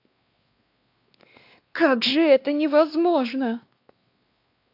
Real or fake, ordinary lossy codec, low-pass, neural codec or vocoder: fake; AAC, 32 kbps; 5.4 kHz; codec, 16 kHz, 2 kbps, X-Codec, WavLM features, trained on Multilingual LibriSpeech